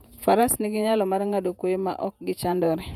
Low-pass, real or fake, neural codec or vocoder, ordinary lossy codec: 19.8 kHz; fake; vocoder, 48 kHz, 128 mel bands, Vocos; none